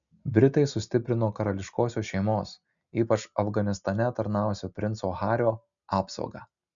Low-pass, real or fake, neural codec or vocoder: 7.2 kHz; real; none